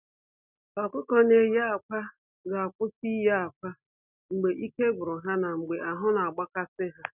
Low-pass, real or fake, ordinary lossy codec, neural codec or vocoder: 3.6 kHz; real; none; none